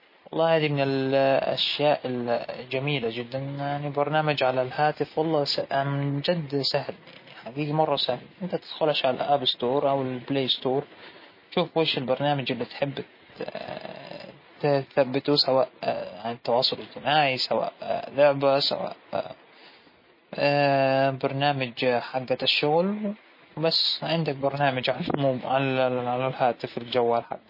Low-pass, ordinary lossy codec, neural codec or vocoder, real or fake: 5.4 kHz; MP3, 24 kbps; none; real